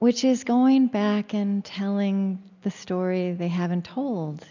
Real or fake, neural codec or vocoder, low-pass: real; none; 7.2 kHz